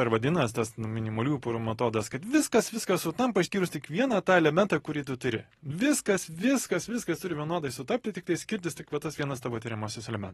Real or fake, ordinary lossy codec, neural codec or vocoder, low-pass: real; AAC, 32 kbps; none; 19.8 kHz